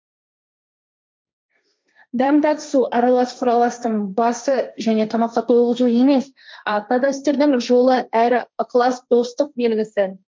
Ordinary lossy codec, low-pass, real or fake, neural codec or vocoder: none; none; fake; codec, 16 kHz, 1.1 kbps, Voila-Tokenizer